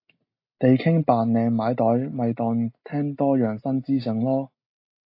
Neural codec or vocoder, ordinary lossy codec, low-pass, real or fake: none; AAC, 32 kbps; 5.4 kHz; real